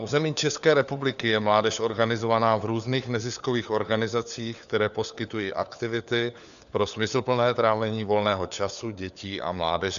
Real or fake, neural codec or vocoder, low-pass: fake; codec, 16 kHz, 4 kbps, FunCodec, trained on LibriTTS, 50 frames a second; 7.2 kHz